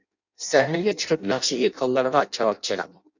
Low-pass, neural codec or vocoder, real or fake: 7.2 kHz; codec, 16 kHz in and 24 kHz out, 0.6 kbps, FireRedTTS-2 codec; fake